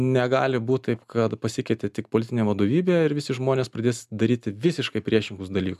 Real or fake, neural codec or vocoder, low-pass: real; none; 14.4 kHz